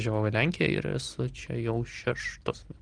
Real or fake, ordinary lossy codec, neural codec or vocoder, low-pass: real; Opus, 32 kbps; none; 9.9 kHz